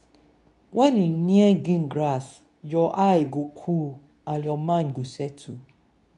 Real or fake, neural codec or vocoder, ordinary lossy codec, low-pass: fake; codec, 24 kHz, 0.9 kbps, WavTokenizer, medium speech release version 2; none; 10.8 kHz